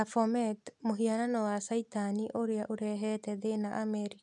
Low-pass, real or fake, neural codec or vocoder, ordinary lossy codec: 10.8 kHz; real; none; none